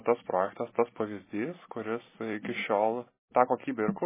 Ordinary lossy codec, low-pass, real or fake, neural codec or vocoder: MP3, 16 kbps; 3.6 kHz; real; none